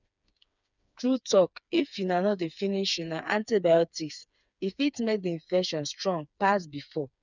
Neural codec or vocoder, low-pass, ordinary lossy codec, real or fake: codec, 16 kHz, 4 kbps, FreqCodec, smaller model; 7.2 kHz; none; fake